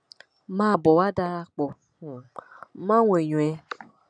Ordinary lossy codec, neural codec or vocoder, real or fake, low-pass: none; none; real; 9.9 kHz